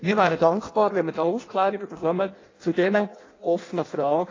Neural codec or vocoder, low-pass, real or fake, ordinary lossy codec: codec, 16 kHz in and 24 kHz out, 0.6 kbps, FireRedTTS-2 codec; 7.2 kHz; fake; AAC, 32 kbps